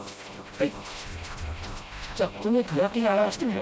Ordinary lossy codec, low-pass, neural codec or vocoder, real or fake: none; none; codec, 16 kHz, 0.5 kbps, FreqCodec, smaller model; fake